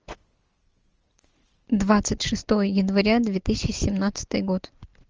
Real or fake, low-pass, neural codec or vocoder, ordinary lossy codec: real; 7.2 kHz; none; Opus, 24 kbps